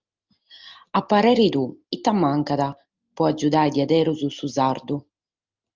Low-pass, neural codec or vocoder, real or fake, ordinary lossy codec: 7.2 kHz; none; real; Opus, 24 kbps